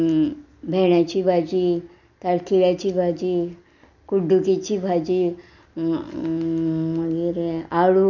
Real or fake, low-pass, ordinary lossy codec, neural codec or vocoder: real; 7.2 kHz; none; none